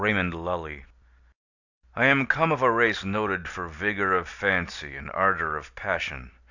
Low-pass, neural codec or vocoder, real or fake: 7.2 kHz; none; real